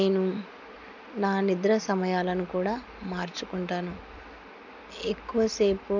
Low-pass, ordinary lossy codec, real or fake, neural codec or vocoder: 7.2 kHz; none; real; none